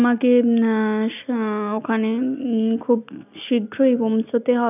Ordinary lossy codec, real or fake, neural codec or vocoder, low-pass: none; real; none; 3.6 kHz